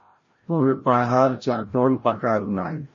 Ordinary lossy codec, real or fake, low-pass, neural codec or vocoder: MP3, 32 kbps; fake; 7.2 kHz; codec, 16 kHz, 0.5 kbps, FreqCodec, larger model